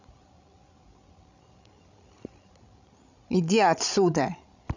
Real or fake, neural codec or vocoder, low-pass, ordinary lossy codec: fake; codec, 16 kHz, 16 kbps, FreqCodec, larger model; 7.2 kHz; none